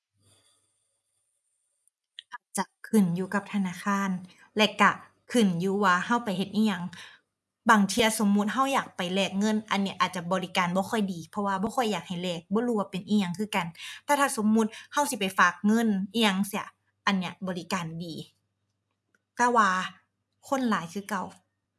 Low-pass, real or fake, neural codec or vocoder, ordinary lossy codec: none; real; none; none